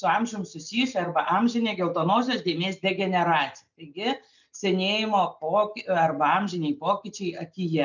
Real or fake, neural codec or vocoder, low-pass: real; none; 7.2 kHz